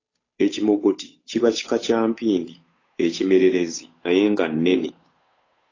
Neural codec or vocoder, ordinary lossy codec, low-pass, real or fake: codec, 16 kHz, 8 kbps, FunCodec, trained on Chinese and English, 25 frames a second; AAC, 32 kbps; 7.2 kHz; fake